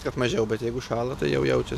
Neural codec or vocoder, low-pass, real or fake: none; 14.4 kHz; real